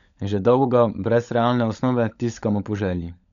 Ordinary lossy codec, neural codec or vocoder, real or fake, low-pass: none; codec, 16 kHz, 16 kbps, FunCodec, trained on LibriTTS, 50 frames a second; fake; 7.2 kHz